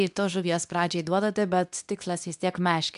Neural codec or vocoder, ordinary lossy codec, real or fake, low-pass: codec, 24 kHz, 0.9 kbps, WavTokenizer, medium speech release version 2; AAC, 96 kbps; fake; 10.8 kHz